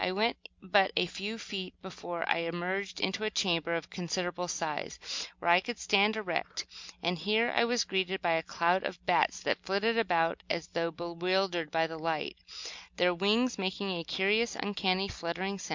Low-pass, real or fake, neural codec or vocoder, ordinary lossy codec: 7.2 kHz; real; none; MP3, 64 kbps